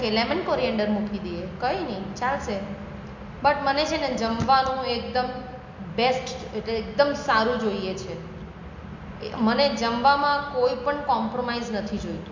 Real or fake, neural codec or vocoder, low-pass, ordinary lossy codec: real; none; 7.2 kHz; MP3, 48 kbps